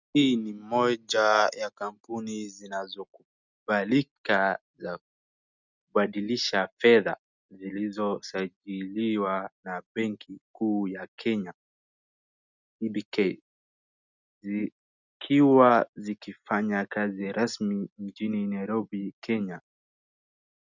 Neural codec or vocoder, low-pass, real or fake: none; 7.2 kHz; real